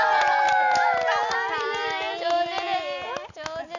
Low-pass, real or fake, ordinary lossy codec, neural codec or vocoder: 7.2 kHz; real; none; none